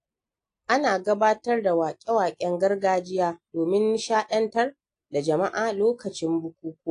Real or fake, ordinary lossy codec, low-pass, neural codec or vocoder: real; AAC, 48 kbps; 9.9 kHz; none